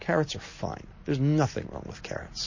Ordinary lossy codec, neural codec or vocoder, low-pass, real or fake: MP3, 32 kbps; none; 7.2 kHz; real